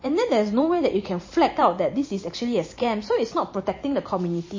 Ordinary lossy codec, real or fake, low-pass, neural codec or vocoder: MP3, 32 kbps; fake; 7.2 kHz; vocoder, 44.1 kHz, 128 mel bands every 512 samples, BigVGAN v2